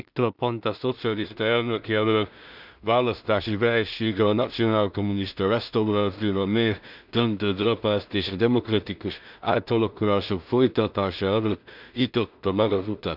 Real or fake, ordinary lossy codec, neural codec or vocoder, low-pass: fake; none; codec, 16 kHz in and 24 kHz out, 0.4 kbps, LongCat-Audio-Codec, two codebook decoder; 5.4 kHz